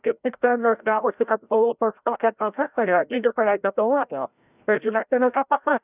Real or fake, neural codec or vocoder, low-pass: fake; codec, 16 kHz, 0.5 kbps, FreqCodec, larger model; 3.6 kHz